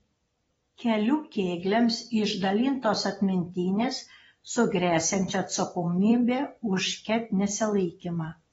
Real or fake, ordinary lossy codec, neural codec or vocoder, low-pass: fake; AAC, 24 kbps; vocoder, 24 kHz, 100 mel bands, Vocos; 10.8 kHz